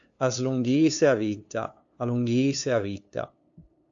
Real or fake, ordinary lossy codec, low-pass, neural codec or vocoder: fake; AAC, 64 kbps; 7.2 kHz; codec, 16 kHz, 2 kbps, FunCodec, trained on LibriTTS, 25 frames a second